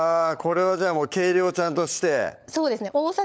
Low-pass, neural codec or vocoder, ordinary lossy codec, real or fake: none; codec, 16 kHz, 16 kbps, FunCodec, trained on LibriTTS, 50 frames a second; none; fake